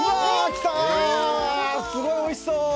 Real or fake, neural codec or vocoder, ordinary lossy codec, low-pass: real; none; none; none